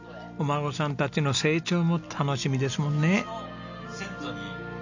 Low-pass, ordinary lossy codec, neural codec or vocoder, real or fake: 7.2 kHz; none; none; real